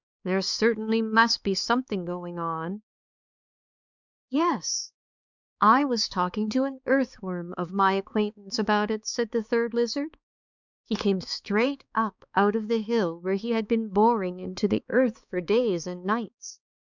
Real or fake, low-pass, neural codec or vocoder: fake; 7.2 kHz; codec, 16 kHz, 4 kbps, X-Codec, HuBERT features, trained on balanced general audio